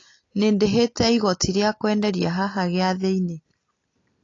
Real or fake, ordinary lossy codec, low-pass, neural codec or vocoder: real; AAC, 32 kbps; 7.2 kHz; none